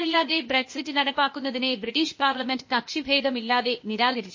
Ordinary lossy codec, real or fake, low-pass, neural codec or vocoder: MP3, 32 kbps; fake; 7.2 kHz; codec, 16 kHz, 0.8 kbps, ZipCodec